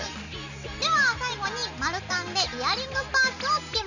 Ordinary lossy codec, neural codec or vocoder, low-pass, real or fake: none; none; 7.2 kHz; real